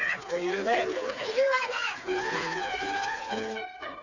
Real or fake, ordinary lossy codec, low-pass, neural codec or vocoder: fake; none; 7.2 kHz; codec, 16 kHz, 4 kbps, FreqCodec, smaller model